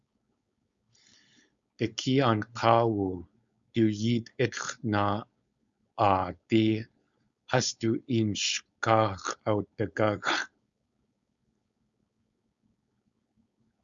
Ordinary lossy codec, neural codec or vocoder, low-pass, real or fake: Opus, 64 kbps; codec, 16 kHz, 4.8 kbps, FACodec; 7.2 kHz; fake